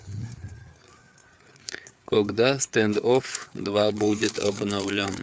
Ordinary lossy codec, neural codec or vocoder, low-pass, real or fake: none; codec, 16 kHz, 4 kbps, FreqCodec, larger model; none; fake